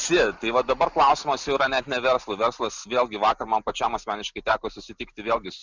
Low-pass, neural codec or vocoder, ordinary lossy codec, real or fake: 7.2 kHz; none; Opus, 64 kbps; real